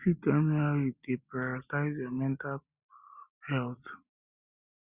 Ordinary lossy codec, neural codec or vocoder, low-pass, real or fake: Opus, 64 kbps; none; 3.6 kHz; real